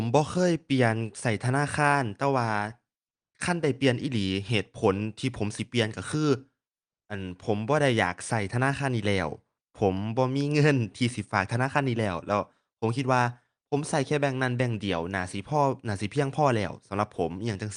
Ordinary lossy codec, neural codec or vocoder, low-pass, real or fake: Opus, 32 kbps; none; 9.9 kHz; real